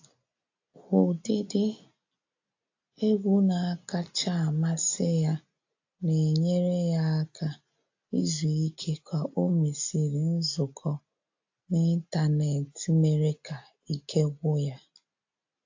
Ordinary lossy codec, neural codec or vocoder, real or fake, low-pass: AAC, 48 kbps; none; real; 7.2 kHz